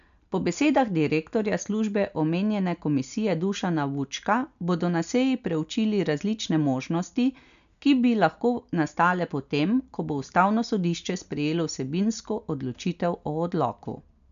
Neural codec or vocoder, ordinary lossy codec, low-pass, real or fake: none; MP3, 96 kbps; 7.2 kHz; real